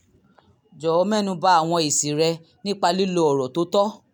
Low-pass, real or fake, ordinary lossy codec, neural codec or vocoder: none; real; none; none